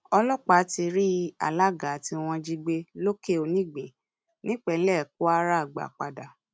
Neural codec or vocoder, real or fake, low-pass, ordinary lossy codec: none; real; none; none